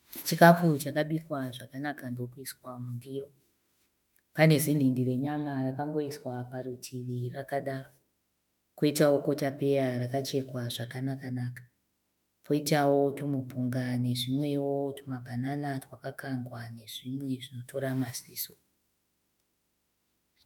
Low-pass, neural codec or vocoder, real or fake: 19.8 kHz; autoencoder, 48 kHz, 32 numbers a frame, DAC-VAE, trained on Japanese speech; fake